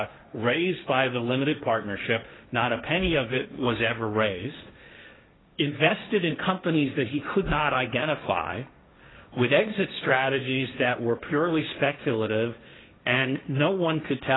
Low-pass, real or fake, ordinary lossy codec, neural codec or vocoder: 7.2 kHz; fake; AAC, 16 kbps; codec, 16 kHz, 1.1 kbps, Voila-Tokenizer